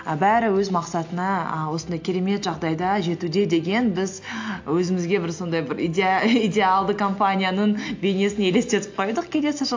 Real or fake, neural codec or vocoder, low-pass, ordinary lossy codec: real; none; 7.2 kHz; AAC, 48 kbps